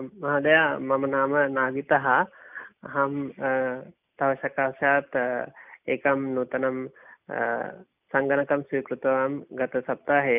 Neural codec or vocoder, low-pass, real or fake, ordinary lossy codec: none; 3.6 kHz; real; none